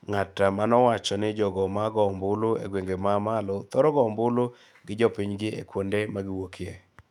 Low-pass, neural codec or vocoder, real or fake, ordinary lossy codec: 19.8 kHz; autoencoder, 48 kHz, 128 numbers a frame, DAC-VAE, trained on Japanese speech; fake; none